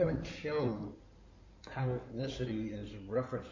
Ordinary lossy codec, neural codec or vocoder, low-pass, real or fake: MP3, 64 kbps; codec, 16 kHz in and 24 kHz out, 2.2 kbps, FireRedTTS-2 codec; 7.2 kHz; fake